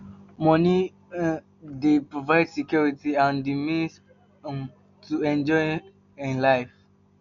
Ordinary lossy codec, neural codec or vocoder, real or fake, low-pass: none; none; real; 7.2 kHz